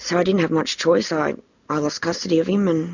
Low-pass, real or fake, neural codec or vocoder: 7.2 kHz; real; none